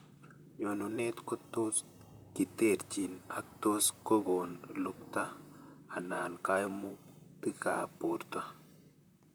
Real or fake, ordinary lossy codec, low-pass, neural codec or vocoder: fake; none; none; vocoder, 44.1 kHz, 128 mel bands, Pupu-Vocoder